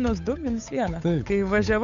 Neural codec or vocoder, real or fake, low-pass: codec, 16 kHz, 8 kbps, FunCodec, trained on Chinese and English, 25 frames a second; fake; 7.2 kHz